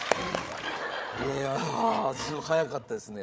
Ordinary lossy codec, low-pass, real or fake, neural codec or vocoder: none; none; fake; codec, 16 kHz, 8 kbps, FreqCodec, larger model